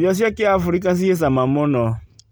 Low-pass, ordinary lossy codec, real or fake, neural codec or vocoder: none; none; real; none